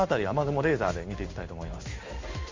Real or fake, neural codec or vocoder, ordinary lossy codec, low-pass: fake; codec, 16 kHz in and 24 kHz out, 1 kbps, XY-Tokenizer; MP3, 48 kbps; 7.2 kHz